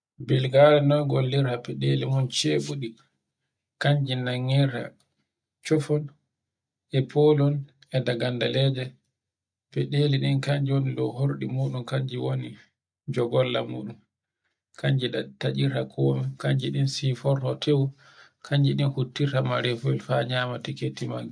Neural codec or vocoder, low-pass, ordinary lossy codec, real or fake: none; 9.9 kHz; none; real